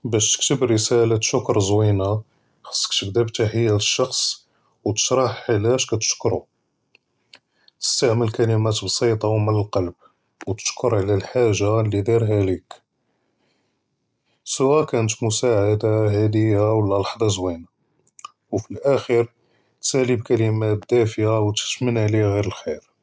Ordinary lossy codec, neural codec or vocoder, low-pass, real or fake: none; none; none; real